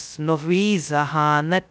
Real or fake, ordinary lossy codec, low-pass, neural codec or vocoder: fake; none; none; codec, 16 kHz, 0.2 kbps, FocalCodec